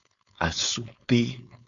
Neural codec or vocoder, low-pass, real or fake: codec, 16 kHz, 4.8 kbps, FACodec; 7.2 kHz; fake